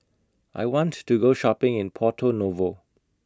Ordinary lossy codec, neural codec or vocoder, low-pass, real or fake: none; none; none; real